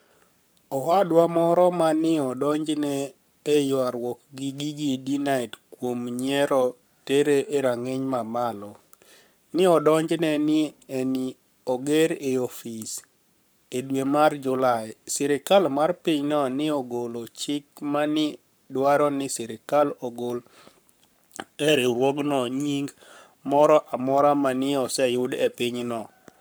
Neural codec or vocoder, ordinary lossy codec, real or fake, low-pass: codec, 44.1 kHz, 7.8 kbps, Pupu-Codec; none; fake; none